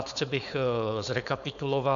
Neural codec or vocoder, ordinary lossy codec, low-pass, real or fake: codec, 16 kHz, 4.8 kbps, FACodec; MP3, 96 kbps; 7.2 kHz; fake